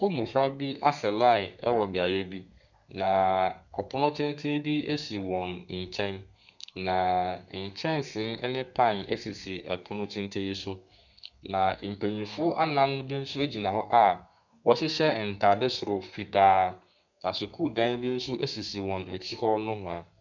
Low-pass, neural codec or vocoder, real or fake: 7.2 kHz; codec, 32 kHz, 1.9 kbps, SNAC; fake